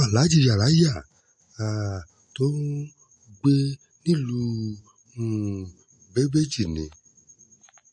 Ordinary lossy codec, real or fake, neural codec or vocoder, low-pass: MP3, 48 kbps; real; none; 10.8 kHz